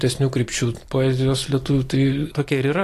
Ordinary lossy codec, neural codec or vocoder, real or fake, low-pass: AAC, 48 kbps; none; real; 14.4 kHz